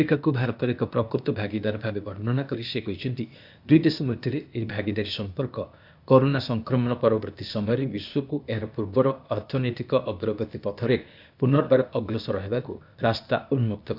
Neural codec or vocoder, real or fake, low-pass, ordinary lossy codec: codec, 16 kHz, 0.8 kbps, ZipCodec; fake; 5.4 kHz; none